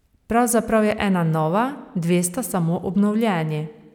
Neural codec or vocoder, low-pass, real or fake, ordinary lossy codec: none; 19.8 kHz; real; none